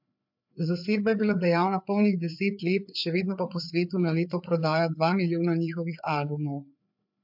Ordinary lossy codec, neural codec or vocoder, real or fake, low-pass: MP3, 48 kbps; codec, 16 kHz, 4 kbps, FreqCodec, larger model; fake; 5.4 kHz